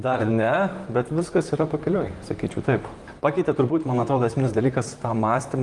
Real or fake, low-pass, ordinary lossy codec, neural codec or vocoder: fake; 10.8 kHz; Opus, 24 kbps; vocoder, 44.1 kHz, 128 mel bands, Pupu-Vocoder